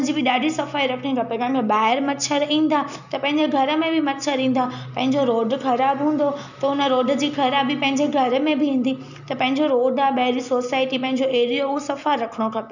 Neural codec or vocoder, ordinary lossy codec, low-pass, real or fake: none; none; 7.2 kHz; real